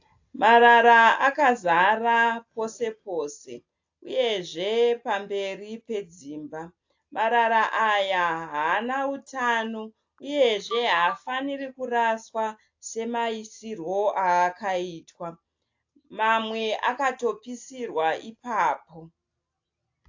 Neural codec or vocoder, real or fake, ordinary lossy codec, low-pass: none; real; AAC, 48 kbps; 7.2 kHz